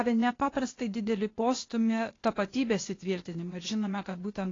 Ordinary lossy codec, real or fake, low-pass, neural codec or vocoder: AAC, 32 kbps; fake; 7.2 kHz; codec, 16 kHz, 0.8 kbps, ZipCodec